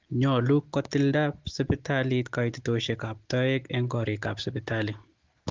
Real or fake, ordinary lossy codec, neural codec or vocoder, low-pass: real; Opus, 16 kbps; none; 7.2 kHz